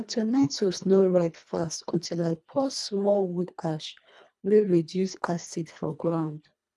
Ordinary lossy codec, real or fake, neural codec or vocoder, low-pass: none; fake; codec, 24 kHz, 1.5 kbps, HILCodec; none